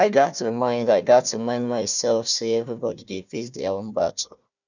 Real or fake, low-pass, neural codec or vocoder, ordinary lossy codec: fake; 7.2 kHz; codec, 16 kHz, 1 kbps, FunCodec, trained on Chinese and English, 50 frames a second; none